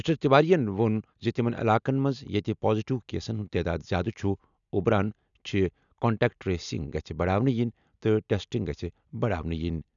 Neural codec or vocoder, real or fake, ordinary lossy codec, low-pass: none; real; none; 7.2 kHz